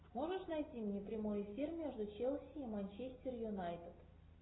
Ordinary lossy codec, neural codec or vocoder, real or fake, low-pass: AAC, 16 kbps; none; real; 7.2 kHz